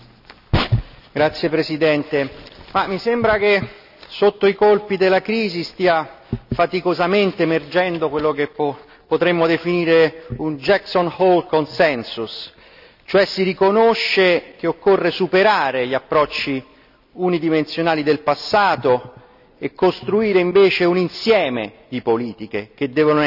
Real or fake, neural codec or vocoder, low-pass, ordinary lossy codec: real; none; 5.4 kHz; none